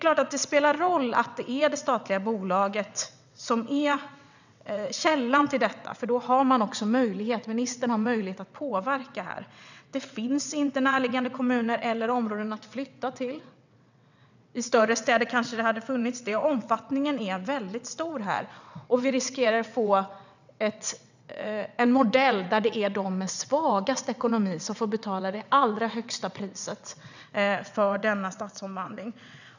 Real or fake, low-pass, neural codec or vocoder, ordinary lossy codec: fake; 7.2 kHz; vocoder, 22.05 kHz, 80 mel bands, WaveNeXt; none